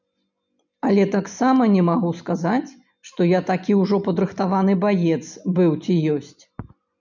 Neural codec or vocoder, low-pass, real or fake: none; 7.2 kHz; real